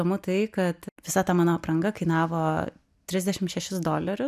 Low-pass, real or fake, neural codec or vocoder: 14.4 kHz; real; none